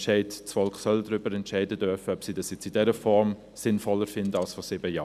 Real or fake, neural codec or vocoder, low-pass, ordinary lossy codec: real; none; 14.4 kHz; none